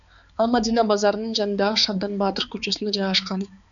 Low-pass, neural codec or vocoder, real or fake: 7.2 kHz; codec, 16 kHz, 4 kbps, X-Codec, HuBERT features, trained on balanced general audio; fake